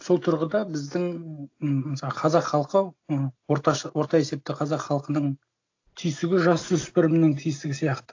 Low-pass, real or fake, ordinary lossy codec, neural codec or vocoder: 7.2 kHz; real; AAC, 48 kbps; none